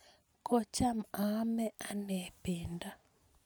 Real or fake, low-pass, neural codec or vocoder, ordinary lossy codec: real; none; none; none